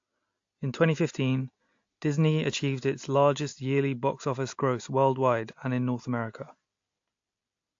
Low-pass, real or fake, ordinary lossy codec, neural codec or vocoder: 7.2 kHz; real; AAC, 48 kbps; none